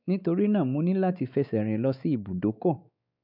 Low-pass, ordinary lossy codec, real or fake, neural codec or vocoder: 5.4 kHz; none; fake; codec, 16 kHz, 4 kbps, X-Codec, WavLM features, trained on Multilingual LibriSpeech